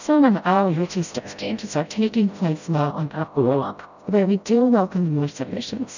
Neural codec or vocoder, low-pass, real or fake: codec, 16 kHz, 0.5 kbps, FreqCodec, smaller model; 7.2 kHz; fake